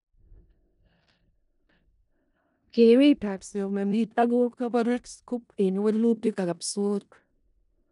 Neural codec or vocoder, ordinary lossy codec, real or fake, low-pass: codec, 16 kHz in and 24 kHz out, 0.4 kbps, LongCat-Audio-Codec, four codebook decoder; none; fake; 10.8 kHz